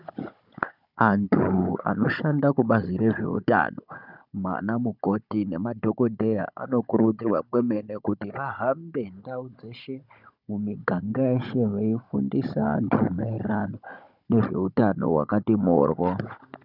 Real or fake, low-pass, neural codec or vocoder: fake; 5.4 kHz; codec, 16 kHz, 16 kbps, FunCodec, trained on Chinese and English, 50 frames a second